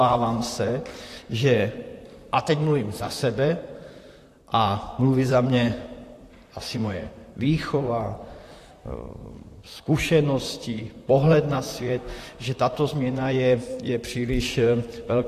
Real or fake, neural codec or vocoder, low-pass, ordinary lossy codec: fake; vocoder, 44.1 kHz, 128 mel bands, Pupu-Vocoder; 14.4 kHz; AAC, 64 kbps